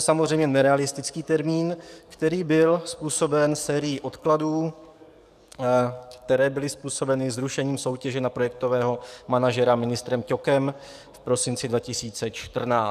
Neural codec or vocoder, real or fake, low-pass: codec, 44.1 kHz, 7.8 kbps, DAC; fake; 14.4 kHz